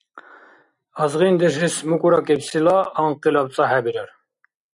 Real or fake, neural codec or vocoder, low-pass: real; none; 10.8 kHz